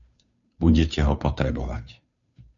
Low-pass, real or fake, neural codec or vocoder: 7.2 kHz; fake; codec, 16 kHz, 2 kbps, FunCodec, trained on Chinese and English, 25 frames a second